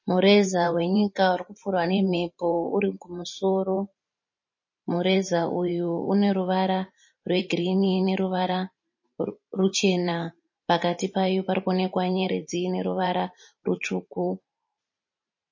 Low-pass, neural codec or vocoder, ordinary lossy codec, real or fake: 7.2 kHz; vocoder, 44.1 kHz, 128 mel bands every 512 samples, BigVGAN v2; MP3, 32 kbps; fake